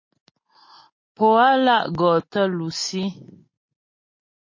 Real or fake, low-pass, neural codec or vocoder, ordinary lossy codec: real; 7.2 kHz; none; MP3, 32 kbps